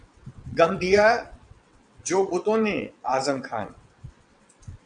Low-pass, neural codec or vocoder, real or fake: 9.9 kHz; vocoder, 22.05 kHz, 80 mel bands, WaveNeXt; fake